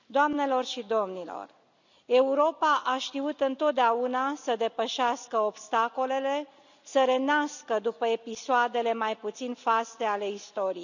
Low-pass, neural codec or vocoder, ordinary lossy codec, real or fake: 7.2 kHz; none; none; real